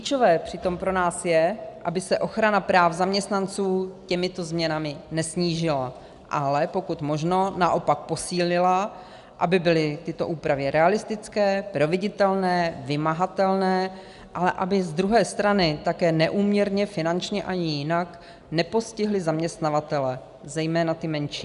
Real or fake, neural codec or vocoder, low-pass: real; none; 10.8 kHz